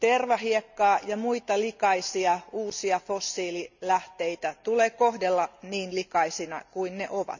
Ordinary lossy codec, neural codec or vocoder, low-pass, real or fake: none; none; 7.2 kHz; real